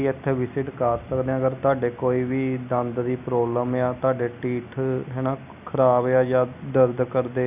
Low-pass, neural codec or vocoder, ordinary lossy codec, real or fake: 3.6 kHz; none; none; real